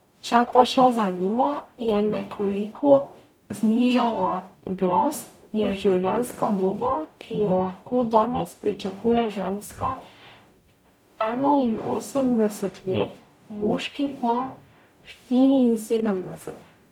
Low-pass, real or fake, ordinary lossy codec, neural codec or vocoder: 19.8 kHz; fake; none; codec, 44.1 kHz, 0.9 kbps, DAC